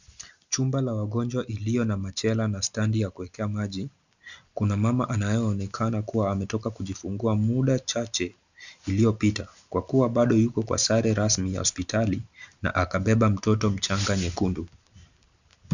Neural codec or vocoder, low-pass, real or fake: none; 7.2 kHz; real